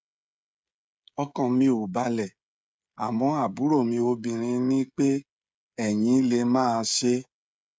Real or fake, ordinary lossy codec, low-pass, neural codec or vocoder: fake; none; none; codec, 16 kHz, 16 kbps, FreqCodec, smaller model